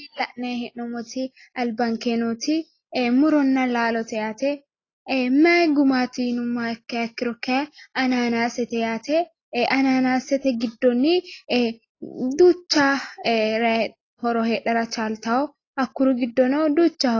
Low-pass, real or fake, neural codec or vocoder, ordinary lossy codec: 7.2 kHz; real; none; AAC, 32 kbps